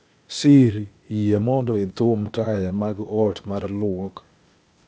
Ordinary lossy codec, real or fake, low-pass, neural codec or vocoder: none; fake; none; codec, 16 kHz, 0.8 kbps, ZipCodec